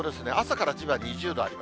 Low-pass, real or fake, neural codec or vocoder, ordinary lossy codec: none; real; none; none